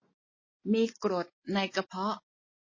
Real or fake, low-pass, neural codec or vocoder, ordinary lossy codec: real; 7.2 kHz; none; MP3, 32 kbps